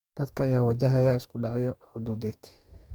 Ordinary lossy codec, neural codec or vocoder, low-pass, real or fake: MP3, 96 kbps; codec, 44.1 kHz, 2.6 kbps, DAC; 19.8 kHz; fake